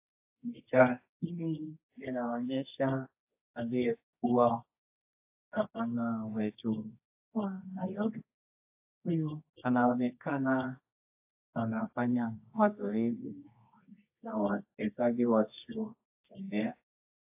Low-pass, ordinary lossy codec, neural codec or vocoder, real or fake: 3.6 kHz; AAC, 32 kbps; codec, 24 kHz, 0.9 kbps, WavTokenizer, medium music audio release; fake